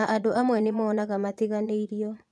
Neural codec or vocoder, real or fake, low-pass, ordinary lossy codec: vocoder, 22.05 kHz, 80 mel bands, Vocos; fake; none; none